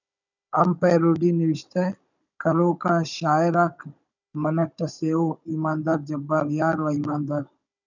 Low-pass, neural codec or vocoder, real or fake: 7.2 kHz; codec, 16 kHz, 16 kbps, FunCodec, trained on Chinese and English, 50 frames a second; fake